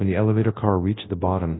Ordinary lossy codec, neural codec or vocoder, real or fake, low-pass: AAC, 16 kbps; codec, 24 kHz, 0.9 kbps, WavTokenizer, large speech release; fake; 7.2 kHz